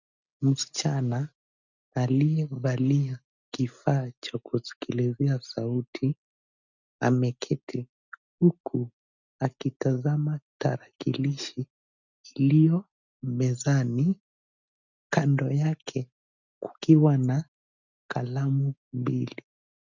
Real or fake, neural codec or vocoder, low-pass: real; none; 7.2 kHz